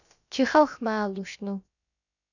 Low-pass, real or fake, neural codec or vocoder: 7.2 kHz; fake; codec, 16 kHz, about 1 kbps, DyCAST, with the encoder's durations